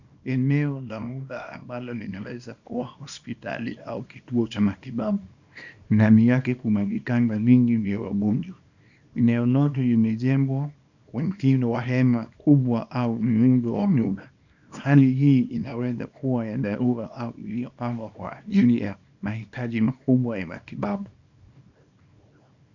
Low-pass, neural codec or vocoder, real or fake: 7.2 kHz; codec, 24 kHz, 0.9 kbps, WavTokenizer, small release; fake